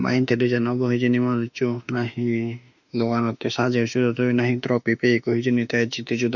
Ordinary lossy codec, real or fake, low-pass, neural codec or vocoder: none; fake; 7.2 kHz; autoencoder, 48 kHz, 32 numbers a frame, DAC-VAE, trained on Japanese speech